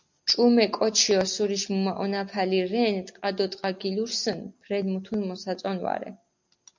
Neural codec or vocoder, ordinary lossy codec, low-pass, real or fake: none; MP3, 64 kbps; 7.2 kHz; real